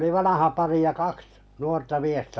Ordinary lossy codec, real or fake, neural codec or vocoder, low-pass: none; real; none; none